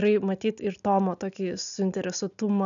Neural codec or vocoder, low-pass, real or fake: none; 7.2 kHz; real